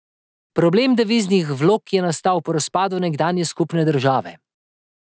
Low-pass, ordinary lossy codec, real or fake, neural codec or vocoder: none; none; real; none